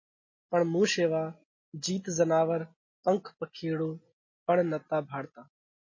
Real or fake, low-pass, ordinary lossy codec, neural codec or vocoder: real; 7.2 kHz; MP3, 32 kbps; none